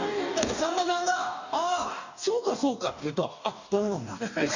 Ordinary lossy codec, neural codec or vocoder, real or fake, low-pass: none; codec, 44.1 kHz, 2.6 kbps, DAC; fake; 7.2 kHz